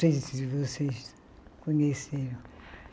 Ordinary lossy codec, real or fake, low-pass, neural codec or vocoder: none; real; none; none